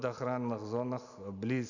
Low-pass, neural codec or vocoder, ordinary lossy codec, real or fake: 7.2 kHz; none; none; real